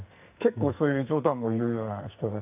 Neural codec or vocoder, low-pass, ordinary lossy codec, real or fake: codec, 44.1 kHz, 2.6 kbps, SNAC; 3.6 kHz; AAC, 32 kbps; fake